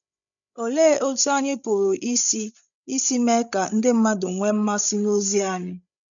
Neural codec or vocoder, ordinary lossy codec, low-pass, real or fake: codec, 16 kHz, 16 kbps, FreqCodec, larger model; none; 7.2 kHz; fake